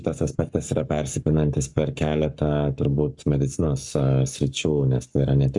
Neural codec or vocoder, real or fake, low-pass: codec, 44.1 kHz, 7.8 kbps, Pupu-Codec; fake; 10.8 kHz